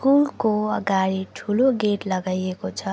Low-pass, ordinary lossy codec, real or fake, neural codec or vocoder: none; none; real; none